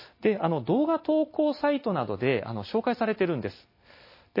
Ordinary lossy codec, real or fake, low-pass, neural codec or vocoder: MP3, 24 kbps; real; 5.4 kHz; none